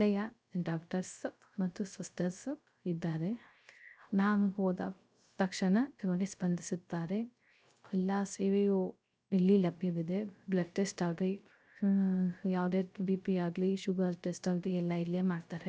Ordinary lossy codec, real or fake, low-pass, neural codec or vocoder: none; fake; none; codec, 16 kHz, 0.3 kbps, FocalCodec